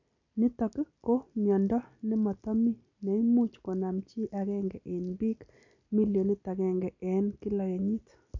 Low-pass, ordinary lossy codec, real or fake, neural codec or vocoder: 7.2 kHz; none; real; none